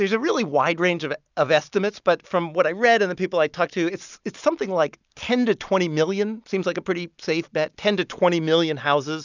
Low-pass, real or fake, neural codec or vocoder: 7.2 kHz; real; none